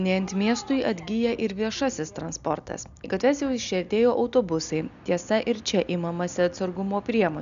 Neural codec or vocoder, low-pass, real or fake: none; 7.2 kHz; real